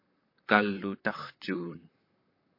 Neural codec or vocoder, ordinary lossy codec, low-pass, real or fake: vocoder, 44.1 kHz, 128 mel bands, Pupu-Vocoder; MP3, 32 kbps; 5.4 kHz; fake